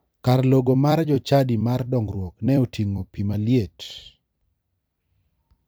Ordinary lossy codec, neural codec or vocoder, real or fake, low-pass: none; vocoder, 44.1 kHz, 128 mel bands every 256 samples, BigVGAN v2; fake; none